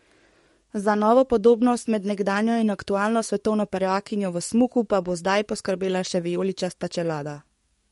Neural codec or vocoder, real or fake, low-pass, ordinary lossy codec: codec, 44.1 kHz, 7.8 kbps, DAC; fake; 19.8 kHz; MP3, 48 kbps